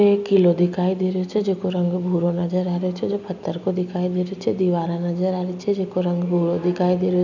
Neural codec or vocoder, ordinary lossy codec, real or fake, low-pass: none; none; real; 7.2 kHz